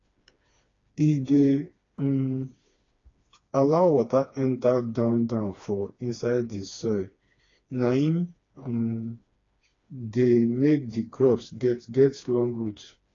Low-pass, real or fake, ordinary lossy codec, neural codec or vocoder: 7.2 kHz; fake; AAC, 32 kbps; codec, 16 kHz, 2 kbps, FreqCodec, smaller model